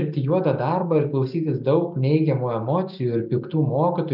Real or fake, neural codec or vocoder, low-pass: real; none; 5.4 kHz